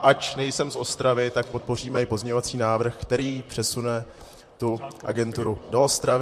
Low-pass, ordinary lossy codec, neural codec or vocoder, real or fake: 14.4 kHz; MP3, 64 kbps; vocoder, 44.1 kHz, 128 mel bands, Pupu-Vocoder; fake